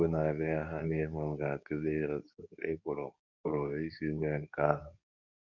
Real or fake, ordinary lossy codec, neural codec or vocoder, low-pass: fake; none; codec, 24 kHz, 0.9 kbps, WavTokenizer, medium speech release version 2; 7.2 kHz